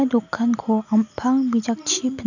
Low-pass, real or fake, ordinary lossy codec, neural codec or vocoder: 7.2 kHz; real; none; none